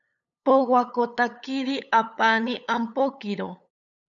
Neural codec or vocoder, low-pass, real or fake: codec, 16 kHz, 8 kbps, FunCodec, trained on LibriTTS, 25 frames a second; 7.2 kHz; fake